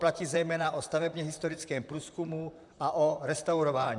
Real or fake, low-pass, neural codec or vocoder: fake; 10.8 kHz; vocoder, 44.1 kHz, 128 mel bands, Pupu-Vocoder